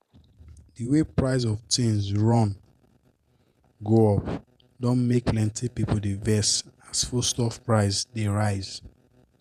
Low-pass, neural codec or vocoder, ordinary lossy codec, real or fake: 14.4 kHz; vocoder, 48 kHz, 128 mel bands, Vocos; none; fake